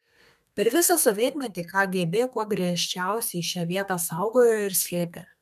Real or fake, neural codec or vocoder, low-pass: fake; codec, 32 kHz, 1.9 kbps, SNAC; 14.4 kHz